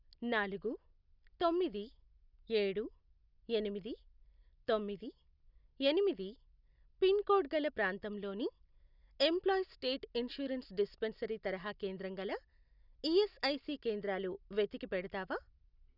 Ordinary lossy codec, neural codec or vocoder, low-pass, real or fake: none; none; 5.4 kHz; real